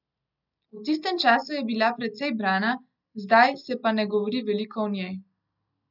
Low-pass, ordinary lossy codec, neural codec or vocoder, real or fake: 5.4 kHz; none; none; real